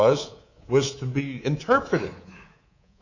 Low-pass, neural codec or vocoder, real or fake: 7.2 kHz; codec, 24 kHz, 3.1 kbps, DualCodec; fake